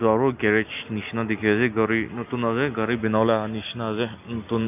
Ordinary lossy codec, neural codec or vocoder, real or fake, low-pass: none; none; real; 3.6 kHz